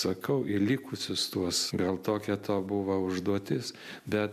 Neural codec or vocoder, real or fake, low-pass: none; real; 14.4 kHz